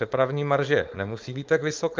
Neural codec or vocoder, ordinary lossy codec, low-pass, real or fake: codec, 16 kHz, 4.8 kbps, FACodec; Opus, 24 kbps; 7.2 kHz; fake